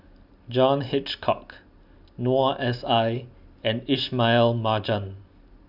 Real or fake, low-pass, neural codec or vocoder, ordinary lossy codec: real; 5.4 kHz; none; none